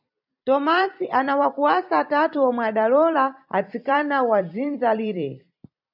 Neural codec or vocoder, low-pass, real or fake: vocoder, 44.1 kHz, 128 mel bands every 256 samples, BigVGAN v2; 5.4 kHz; fake